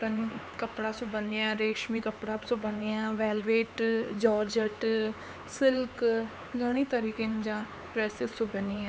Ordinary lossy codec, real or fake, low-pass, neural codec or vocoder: none; fake; none; codec, 16 kHz, 4 kbps, X-Codec, HuBERT features, trained on LibriSpeech